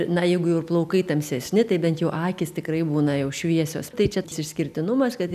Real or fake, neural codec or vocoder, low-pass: real; none; 14.4 kHz